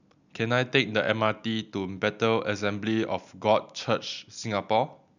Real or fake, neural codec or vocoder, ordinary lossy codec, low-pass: real; none; none; 7.2 kHz